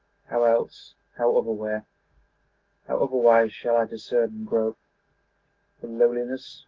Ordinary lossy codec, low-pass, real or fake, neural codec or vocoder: Opus, 16 kbps; 7.2 kHz; fake; autoencoder, 48 kHz, 128 numbers a frame, DAC-VAE, trained on Japanese speech